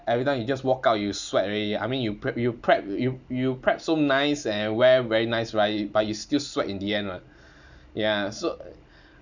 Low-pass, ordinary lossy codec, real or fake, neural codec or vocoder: 7.2 kHz; none; real; none